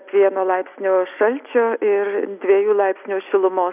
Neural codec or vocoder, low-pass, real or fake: none; 3.6 kHz; real